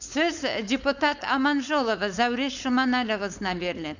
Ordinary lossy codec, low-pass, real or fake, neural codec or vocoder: none; 7.2 kHz; fake; codec, 16 kHz, 4.8 kbps, FACodec